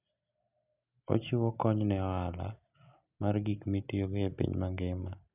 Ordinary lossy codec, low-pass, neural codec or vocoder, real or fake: none; 3.6 kHz; none; real